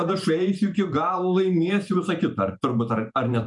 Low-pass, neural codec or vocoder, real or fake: 10.8 kHz; vocoder, 44.1 kHz, 128 mel bands every 512 samples, BigVGAN v2; fake